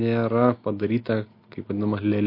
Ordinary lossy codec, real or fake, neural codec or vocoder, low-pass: MP3, 32 kbps; real; none; 5.4 kHz